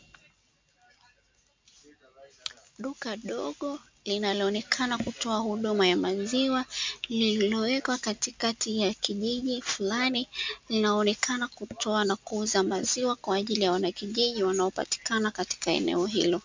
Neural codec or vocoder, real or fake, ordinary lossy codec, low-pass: none; real; MP3, 64 kbps; 7.2 kHz